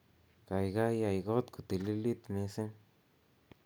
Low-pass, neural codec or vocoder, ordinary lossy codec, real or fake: none; none; none; real